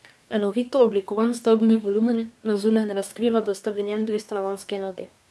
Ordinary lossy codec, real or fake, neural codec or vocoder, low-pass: none; fake; codec, 24 kHz, 1 kbps, SNAC; none